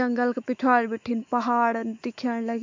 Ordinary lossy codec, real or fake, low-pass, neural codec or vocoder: MP3, 48 kbps; fake; 7.2 kHz; autoencoder, 48 kHz, 128 numbers a frame, DAC-VAE, trained on Japanese speech